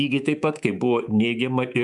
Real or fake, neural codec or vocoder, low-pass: fake; codec, 24 kHz, 3.1 kbps, DualCodec; 10.8 kHz